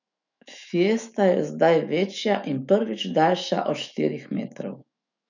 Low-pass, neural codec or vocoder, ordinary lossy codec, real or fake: 7.2 kHz; autoencoder, 48 kHz, 128 numbers a frame, DAC-VAE, trained on Japanese speech; none; fake